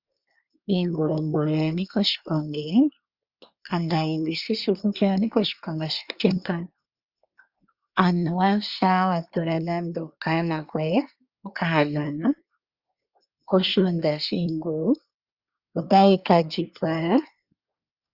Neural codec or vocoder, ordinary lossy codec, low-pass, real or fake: codec, 24 kHz, 1 kbps, SNAC; Opus, 64 kbps; 5.4 kHz; fake